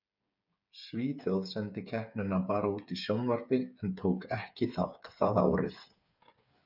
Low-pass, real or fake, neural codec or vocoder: 5.4 kHz; fake; codec, 16 kHz, 8 kbps, FreqCodec, smaller model